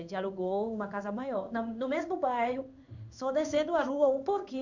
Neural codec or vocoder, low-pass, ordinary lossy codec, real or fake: codec, 16 kHz in and 24 kHz out, 1 kbps, XY-Tokenizer; 7.2 kHz; none; fake